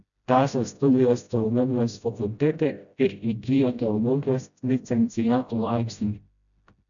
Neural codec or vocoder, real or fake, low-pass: codec, 16 kHz, 0.5 kbps, FreqCodec, smaller model; fake; 7.2 kHz